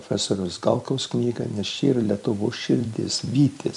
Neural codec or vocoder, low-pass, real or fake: vocoder, 44.1 kHz, 128 mel bands every 256 samples, BigVGAN v2; 10.8 kHz; fake